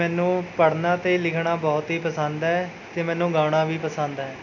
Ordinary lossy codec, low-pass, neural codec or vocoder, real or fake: none; 7.2 kHz; none; real